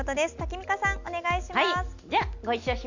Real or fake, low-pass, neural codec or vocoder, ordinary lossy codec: real; 7.2 kHz; none; none